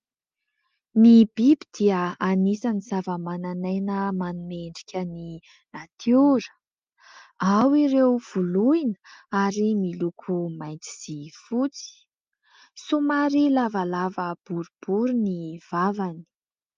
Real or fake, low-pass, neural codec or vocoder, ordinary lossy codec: real; 7.2 kHz; none; Opus, 32 kbps